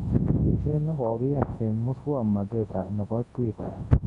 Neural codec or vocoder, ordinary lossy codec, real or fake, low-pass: codec, 24 kHz, 0.9 kbps, WavTokenizer, large speech release; AAC, 32 kbps; fake; 10.8 kHz